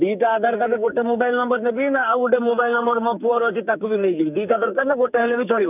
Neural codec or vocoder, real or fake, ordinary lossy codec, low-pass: codec, 44.1 kHz, 2.6 kbps, SNAC; fake; none; 3.6 kHz